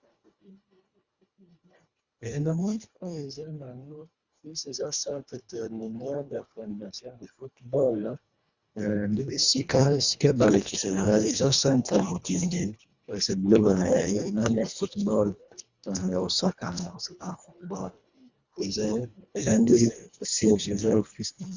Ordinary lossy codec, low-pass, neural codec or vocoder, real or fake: Opus, 64 kbps; 7.2 kHz; codec, 24 kHz, 1.5 kbps, HILCodec; fake